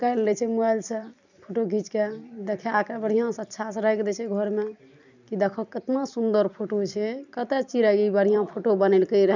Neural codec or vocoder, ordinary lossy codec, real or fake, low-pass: vocoder, 44.1 kHz, 128 mel bands every 256 samples, BigVGAN v2; none; fake; 7.2 kHz